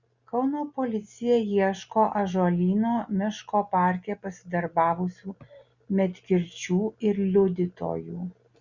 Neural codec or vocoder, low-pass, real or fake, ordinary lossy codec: none; 7.2 kHz; real; Opus, 64 kbps